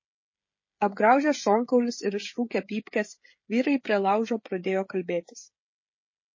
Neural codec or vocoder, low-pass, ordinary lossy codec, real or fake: codec, 16 kHz, 16 kbps, FreqCodec, smaller model; 7.2 kHz; MP3, 32 kbps; fake